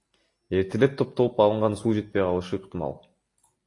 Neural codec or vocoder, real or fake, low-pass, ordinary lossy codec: none; real; 10.8 kHz; AAC, 48 kbps